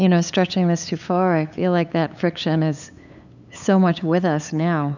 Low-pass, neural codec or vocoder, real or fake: 7.2 kHz; codec, 16 kHz, 8 kbps, FunCodec, trained on LibriTTS, 25 frames a second; fake